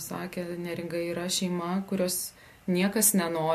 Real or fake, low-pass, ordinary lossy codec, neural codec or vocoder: real; 14.4 kHz; MP3, 64 kbps; none